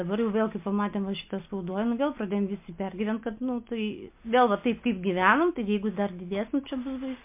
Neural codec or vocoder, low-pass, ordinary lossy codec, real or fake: none; 3.6 kHz; MP3, 32 kbps; real